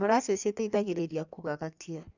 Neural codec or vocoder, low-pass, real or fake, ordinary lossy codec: codec, 16 kHz in and 24 kHz out, 1.1 kbps, FireRedTTS-2 codec; 7.2 kHz; fake; none